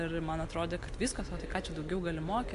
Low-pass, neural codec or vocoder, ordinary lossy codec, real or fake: 10.8 kHz; none; MP3, 64 kbps; real